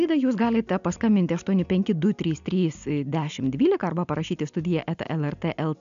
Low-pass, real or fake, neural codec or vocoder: 7.2 kHz; real; none